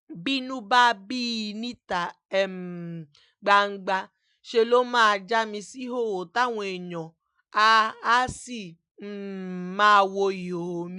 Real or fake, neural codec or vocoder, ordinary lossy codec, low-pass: real; none; none; 10.8 kHz